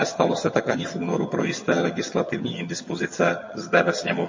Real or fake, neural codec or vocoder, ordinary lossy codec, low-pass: fake; vocoder, 22.05 kHz, 80 mel bands, HiFi-GAN; MP3, 32 kbps; 7.2 kHz